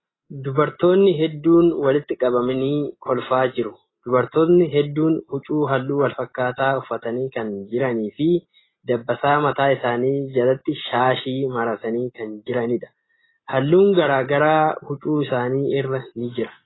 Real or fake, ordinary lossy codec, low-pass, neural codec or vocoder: real; AAC, 16 kbps; 7.2 kHz; none